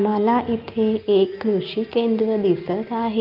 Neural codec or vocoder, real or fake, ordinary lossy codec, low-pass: none; real; Opus, 32 kbps; 5.4 kHz